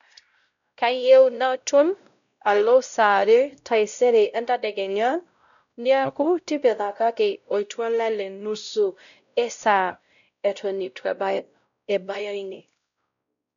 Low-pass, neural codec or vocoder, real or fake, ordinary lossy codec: 7.2 kHz; codec, 16 kHz, 0.5 kbps, X-Codec, WavLM features, trained on Multilingual LibriSpeech; fake; none